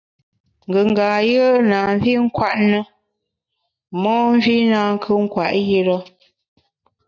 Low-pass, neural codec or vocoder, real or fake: 7.2 kHz; none; real